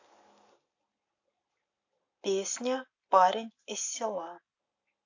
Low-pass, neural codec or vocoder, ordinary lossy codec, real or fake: 7.2 kHz; none; AAC, 48 kbps; real